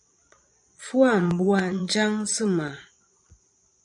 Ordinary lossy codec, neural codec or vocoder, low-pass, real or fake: MP3, 96 kbps; vocoder, 22.05 kHz, 80 mel bands, Vocos; 9.9 kHz; fake